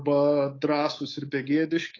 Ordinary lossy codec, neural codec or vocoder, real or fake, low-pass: AAC, 32 kbps; codec, 24 kHz, 3.1 kbps, DualCodec; fake; 7.2 kHz